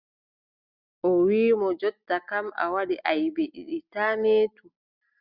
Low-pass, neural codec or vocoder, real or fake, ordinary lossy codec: 5.4 kHz; none; real; Opus, 64 kbps